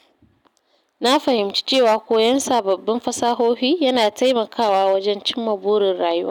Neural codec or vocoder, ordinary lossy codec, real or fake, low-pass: none; none; real; 19.8 kHz